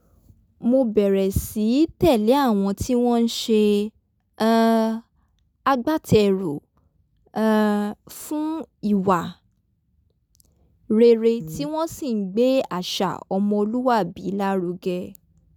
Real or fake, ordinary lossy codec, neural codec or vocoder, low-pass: real; none; none; 19.8 kHz